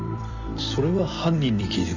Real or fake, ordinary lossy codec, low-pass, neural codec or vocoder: fake; none; 7.2 kHz; vocoder, 44.1 kHz, 128 mel bands every 256 samples, BigVGAN v2